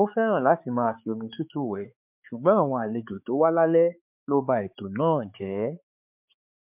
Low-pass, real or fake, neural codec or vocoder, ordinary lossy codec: 3.6 kHz; fake; codec, 16 kHz, 4 kbps, X-Codec, HuBERT features, trained on balanced general audio; MP3, 32 kbps